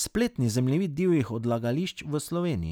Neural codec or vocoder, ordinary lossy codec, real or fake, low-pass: none; none; real; none